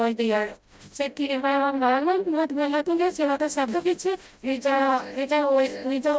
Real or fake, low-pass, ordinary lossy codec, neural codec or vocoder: fake; none; none; codec, 16 kHz, 0.5 kbps, FreqCodec, smaller model